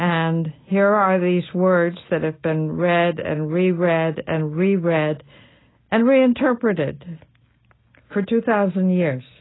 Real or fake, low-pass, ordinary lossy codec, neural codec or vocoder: real; 7.2 kHz; AAC, 16 kbps; none